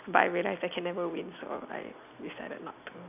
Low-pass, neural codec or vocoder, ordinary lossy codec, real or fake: 3.6 kHz; none; none; real